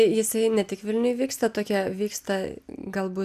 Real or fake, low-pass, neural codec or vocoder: real; 14.4 kHz; none